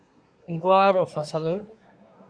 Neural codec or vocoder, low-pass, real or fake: codec, 24 kHz, 1 kbps, SNAC; 9.9 kHz; fake